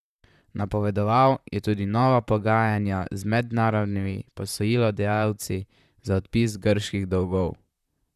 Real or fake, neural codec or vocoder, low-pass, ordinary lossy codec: fake; vocoder, 44.1 kHz, 128 mel bands, Pupu-Vocoder; 14.4 kHz; none